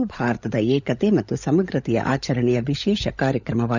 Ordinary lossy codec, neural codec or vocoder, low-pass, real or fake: none; codec, 16 kHz, 16 kbps, FunCodec, trained on LibriTTS, 50 frames a second; 7.2 kHz; fake